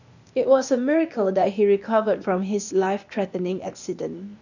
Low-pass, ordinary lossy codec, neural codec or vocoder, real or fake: 7.2 kHz; none; codec, 16 kHz, 0.8 kbps, ZipCodec; fake